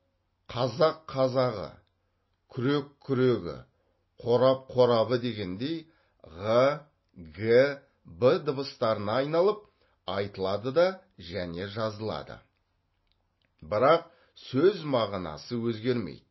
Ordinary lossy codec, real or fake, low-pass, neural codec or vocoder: MP3, 24 kbps; real; 7.2 kHz; none